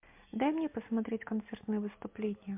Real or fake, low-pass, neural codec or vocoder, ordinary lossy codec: real; 3.6 kHz; none; MP3, 32 kbps